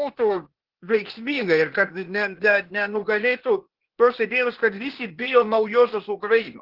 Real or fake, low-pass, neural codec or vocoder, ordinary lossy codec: fake; 5.4 kHz; codec, 16 kHz, 0.8 kbps, ZipCodec; Opus, 16 kbps